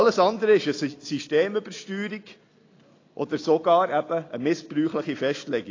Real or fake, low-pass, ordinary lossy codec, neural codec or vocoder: real; 7.2 kHz; AAC, 32 kbps; none